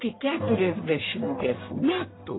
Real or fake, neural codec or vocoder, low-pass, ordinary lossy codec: fake; codec, 44.1 kHz, 1.7 kbps, Pupu-Codec; 7.2 kHz; AAC, 16 kbps